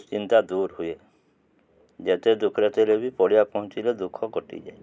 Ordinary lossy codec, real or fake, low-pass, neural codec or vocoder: none; real; none; none